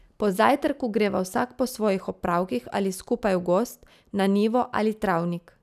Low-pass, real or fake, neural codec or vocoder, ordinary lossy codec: 14.4 kHz; real; none; none